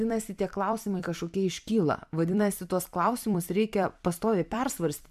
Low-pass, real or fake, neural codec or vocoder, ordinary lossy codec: 14.4 kHz; fake; vocoder, 44.1 kHz, 128 mel bands every 256 samples, BigVGAN v2; AAC, 96 kbps